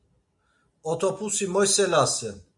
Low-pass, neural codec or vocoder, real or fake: 10.8 kHz; none; real